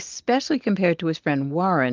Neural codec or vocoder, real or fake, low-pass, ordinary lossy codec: none; real; 7.2 kHz; Opus, 32 kbps